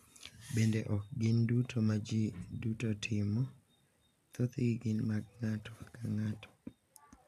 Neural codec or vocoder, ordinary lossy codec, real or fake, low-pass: none; none; real; 14.4 kHz